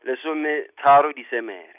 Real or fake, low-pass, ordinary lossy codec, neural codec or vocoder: real; 3.6 kHz; none; none